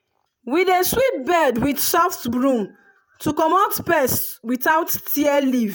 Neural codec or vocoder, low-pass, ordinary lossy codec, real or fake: vocoder, 48 kHz, 128 mel bands, Vocos; none; none; fake